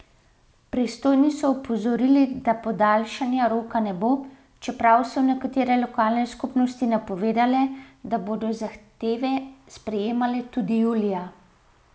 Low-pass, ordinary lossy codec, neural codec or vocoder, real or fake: none; none; none; real